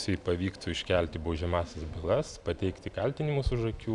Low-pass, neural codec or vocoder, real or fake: 10.8 kHz; none; real